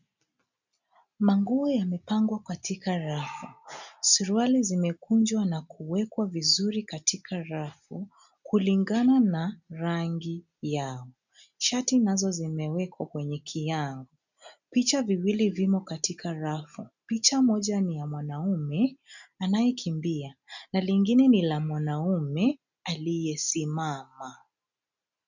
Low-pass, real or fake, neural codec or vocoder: 7.2 kHz; real; none